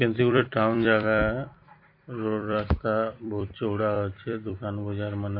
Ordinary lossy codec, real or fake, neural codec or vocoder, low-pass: MP3, 32 kbps; fake; vocoder, 44.1 kHz, 128 mel bands every 256 samples, BigVGAN v2; 5.4 kHz